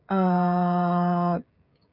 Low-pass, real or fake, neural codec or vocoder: 5.4 kHz; fake; codec, 16 kHz, 16 kbps, FreqCodec, smaller model